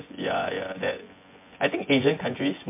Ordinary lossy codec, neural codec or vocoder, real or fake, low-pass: MP3, 24 kbps; vocoder, 24 kHz, 100 mel bands, Vocos; fake; 3.6 kHz